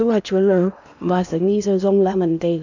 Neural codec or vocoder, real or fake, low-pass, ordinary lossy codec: codec, 16 kHz in and 24 kHz out, 0.8 kbps, FocalCodec, streaming, 65536 codes; fake; 7.2 kHz; none